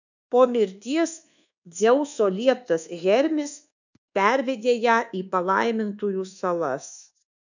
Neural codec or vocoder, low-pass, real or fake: codec, 24 kHz, 1.2 kbps, DualCodec; 7.2 kHz; fake